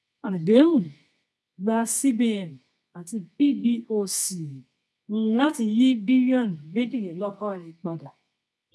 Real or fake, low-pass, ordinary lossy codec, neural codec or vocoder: fake; none; none; codec, 24 kHz, 0.9 kbps, WavTokenizer, medium music audio release